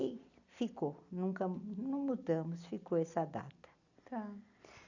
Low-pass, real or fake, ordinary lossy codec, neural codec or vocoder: 7.2 kHz; real; none; none